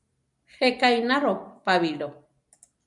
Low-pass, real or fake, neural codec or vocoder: 10.8 kHz; real; none